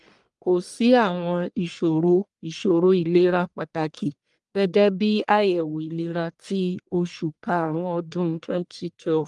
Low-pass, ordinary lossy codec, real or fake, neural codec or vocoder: 10.8 kHz; Opus, 32 kbps; fake; codec, 44.1 kHz, 1.7 kbps, Pupu-Codec